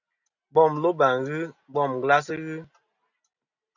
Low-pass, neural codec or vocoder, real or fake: 7.2 kHz; none; real